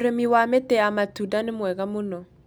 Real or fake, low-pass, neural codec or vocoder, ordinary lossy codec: real; none; none; none